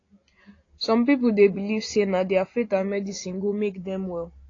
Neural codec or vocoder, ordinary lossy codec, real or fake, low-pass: none; AAC, 32 kbps; real; 7.2 kHz